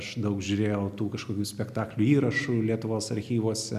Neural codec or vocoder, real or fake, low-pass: none; real; 14.4 kHz